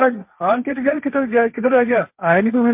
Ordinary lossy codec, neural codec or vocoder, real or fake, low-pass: MP3, 32 kbps; codec, 16 kHz, 1.1 kbps, Voila-Tokenizer; fake; 3.6 kHz